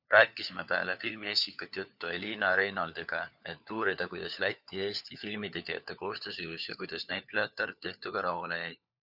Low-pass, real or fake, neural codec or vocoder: 5.4 kHz; fake; codec, 16 kHz, 4 kbps, FunCodec, trained on LibriTTS, 50 frames a second